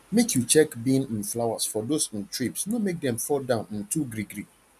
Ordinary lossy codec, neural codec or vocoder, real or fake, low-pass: none; none; real; 14.4 kHz